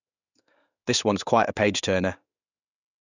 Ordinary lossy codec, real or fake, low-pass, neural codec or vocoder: none; fake; 7.2 kHz; codec, 16 kHz in and 24 kHz out, 1 kbps, XY-Tokenizer